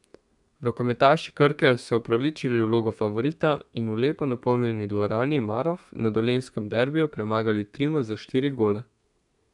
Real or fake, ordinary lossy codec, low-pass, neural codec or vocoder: fake; none; 10.8 kHz; codec, 32 kHz, 1.9 kbps, SNAC